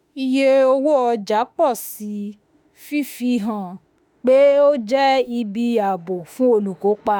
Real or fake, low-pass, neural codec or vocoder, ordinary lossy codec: fake; none; autoencoder, 48 kHz, 32 numbers a frame, DAC-VAE, trained on Japanese speech; none